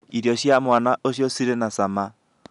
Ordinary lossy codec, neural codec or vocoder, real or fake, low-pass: none; none; real; 10.8 kHz